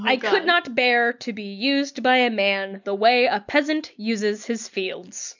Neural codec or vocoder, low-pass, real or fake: none; 7.2 kHz; real